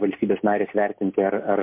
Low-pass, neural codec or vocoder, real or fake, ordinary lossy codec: 3.6 kHz; none; real; MP3, 32 kbps